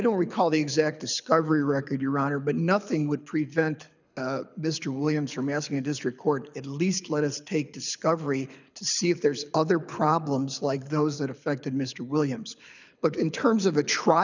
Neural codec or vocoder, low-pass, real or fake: codec, 24 kHz, 6 kbps, HILCodec; 7.2 kHz; fake